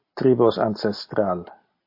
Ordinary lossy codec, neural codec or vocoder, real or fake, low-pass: MP3, 32 kbps; none; real; 5.4 kHz